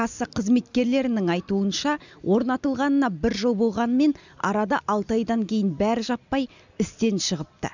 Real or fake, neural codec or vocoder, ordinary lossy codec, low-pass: real; none; none; 7.2 kHz